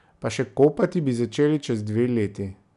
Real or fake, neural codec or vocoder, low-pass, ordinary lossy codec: real; none; 10.8 kHz; none